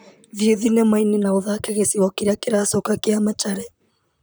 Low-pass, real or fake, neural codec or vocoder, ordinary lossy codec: none; fake; vocoder, 44.1 kHz, 128 mel bands, Pupu-Vocoder; none